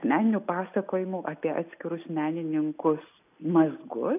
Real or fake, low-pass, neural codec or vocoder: real; 3.6 kHz; none